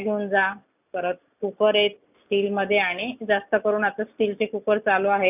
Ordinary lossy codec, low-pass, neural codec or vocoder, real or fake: none; 3.6 kHz; none; real